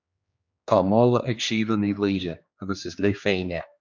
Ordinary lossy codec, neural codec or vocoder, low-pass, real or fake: MP3, 64 kbps; codec, 16 kHz, 2 kbps, X-Codec, HuBERT features, trained on general audio; 7.2 kHz; fake